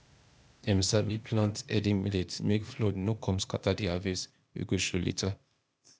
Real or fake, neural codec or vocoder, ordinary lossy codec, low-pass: fake; codec, 16 kHz, 0.8 kbps, ZipCodec; none; none